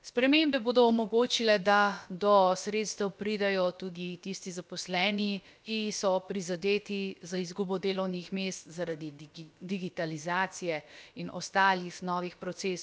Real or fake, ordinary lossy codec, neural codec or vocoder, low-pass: fake; none; codec, 16 kHz, about 1 kbps, DyCAST, with the encoder's durations; none